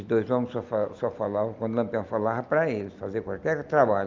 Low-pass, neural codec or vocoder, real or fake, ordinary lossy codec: 7.2 kHz; none; real; Opus, 24 kbps